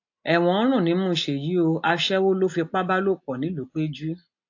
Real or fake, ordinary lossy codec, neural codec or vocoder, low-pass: real; AAC, 48 kbps; none; 7.2 kHz